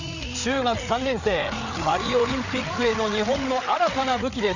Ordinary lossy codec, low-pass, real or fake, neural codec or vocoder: none; 7.2 kHz; fake; codec, 16 kHz, 8 kbps, FreqCodec, larger model